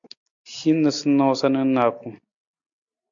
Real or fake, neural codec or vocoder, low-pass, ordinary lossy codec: real; none; 7.2 kHz; MP3, 64 kbps